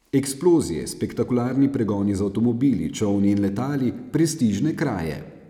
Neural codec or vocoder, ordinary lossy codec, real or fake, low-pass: none; none; real; 19.8 kHz